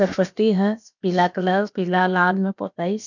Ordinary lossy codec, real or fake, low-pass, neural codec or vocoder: none; fake; 7.2 kHz; codec, 16 kHz, about 1 kbps, DyCAST, with the encoder's durations